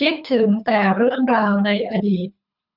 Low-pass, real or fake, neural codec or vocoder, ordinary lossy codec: 5.4 kHz; fake; codec, 24 kHz, 3 kbps, HILCodec; none